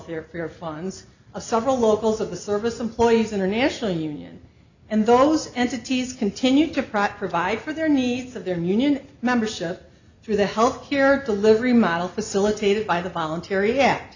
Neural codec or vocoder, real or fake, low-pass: none; real; 7.2 kHz